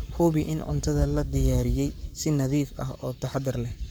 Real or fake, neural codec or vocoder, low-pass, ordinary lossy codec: fake; codec, 44.1 kHz, 7.8 kbps, Pupu-Codec; none; none